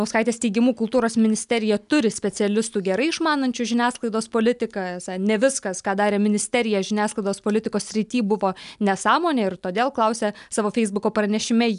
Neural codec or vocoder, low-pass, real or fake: none; 10.8 kHz; real